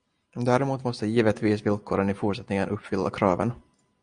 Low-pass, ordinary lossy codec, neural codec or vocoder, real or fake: 9.9 kHz; Opus, 64 kbps; none; real